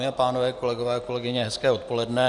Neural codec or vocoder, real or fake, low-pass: none; real; 10.8 kHz